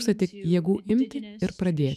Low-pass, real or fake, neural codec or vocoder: 14.4 kHz; fake; autoencoder, 48 kHz, 128 numbers a frame, DAC-VAE, trained on Japanese speech